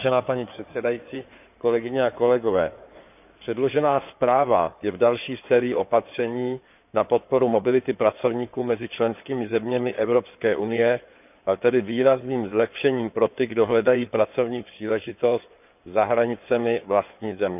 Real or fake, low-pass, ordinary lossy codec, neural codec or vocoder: fake; 3.6 kHz; none; codec, 16 kHz in and 24 kHz out, 2.2 kbps, FireRedTTS-2 codec